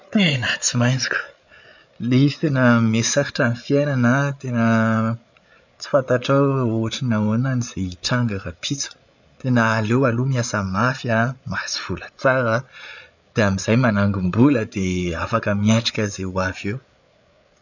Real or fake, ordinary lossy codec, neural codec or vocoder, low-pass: fake; none; codec, 16 kHz, 8 kbps, FreqCodec, larger model; 7.2 kHz